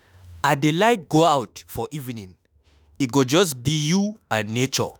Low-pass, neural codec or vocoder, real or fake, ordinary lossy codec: none; autoencoder, 48 kHz, 32 numbers a frame, DAC-VAE, trained on Japanese speech; fake; none